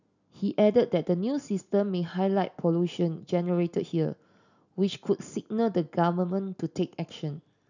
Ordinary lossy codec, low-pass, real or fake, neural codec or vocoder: none; 7.2 kHz; real; none